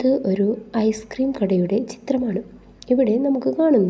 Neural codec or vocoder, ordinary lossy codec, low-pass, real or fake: none; none; none; real